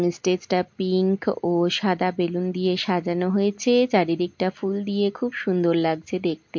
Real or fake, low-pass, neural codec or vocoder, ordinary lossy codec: real; 7.2 kHz; none; MP3, 48 kbps